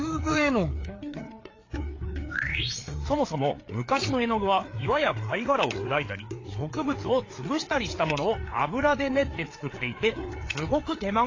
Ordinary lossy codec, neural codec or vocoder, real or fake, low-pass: AAC, 32 kbps; codec, 16 kHz, 4 kbps, FreqCodec, larger model; fake; 7.2 kHz